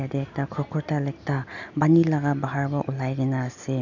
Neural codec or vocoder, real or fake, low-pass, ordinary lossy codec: none; real; 7.2 kHz; none